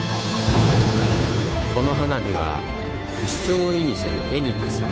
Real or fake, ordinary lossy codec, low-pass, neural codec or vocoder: fake; none; none; codec, 16 kHz, 2 kbps, FunCodec, trained on Chinese and English, 25 frames a second